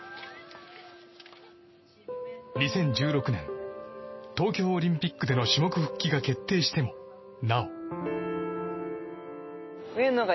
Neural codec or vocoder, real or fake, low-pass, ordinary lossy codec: none; real; 7.2 kHz; MP3, 24 kbps